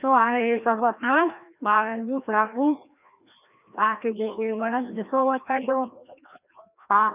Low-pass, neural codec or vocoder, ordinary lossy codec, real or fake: 3.6 kHz; codec, 16 kHz, 1 kbps, FreqCodec, larger model; none; fake